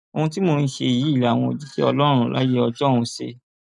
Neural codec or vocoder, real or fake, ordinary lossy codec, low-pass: none; real; none; 10.8 kHz